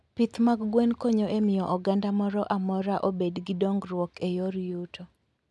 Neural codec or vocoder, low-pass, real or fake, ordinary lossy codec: none; none; real; none